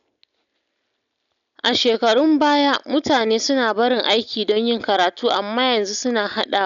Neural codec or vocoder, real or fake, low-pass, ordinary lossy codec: none; real; 7.2 kHz; none